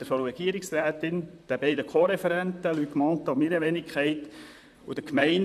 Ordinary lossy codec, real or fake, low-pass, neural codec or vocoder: none; fake; 14.4 kHz; vocoder, 44.1 kHz, 128 mel bands, Pupu-Vocoder